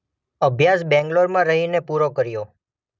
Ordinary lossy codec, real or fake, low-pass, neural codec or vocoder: none; real; none; none